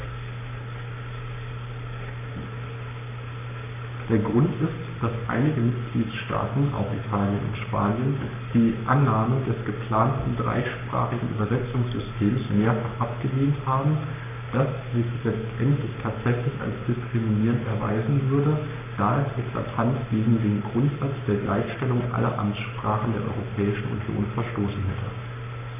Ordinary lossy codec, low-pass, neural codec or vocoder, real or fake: none; 3.6 kHz; codec, 44.1 kHz, 7.8 kbps, Pupu-Codec; fake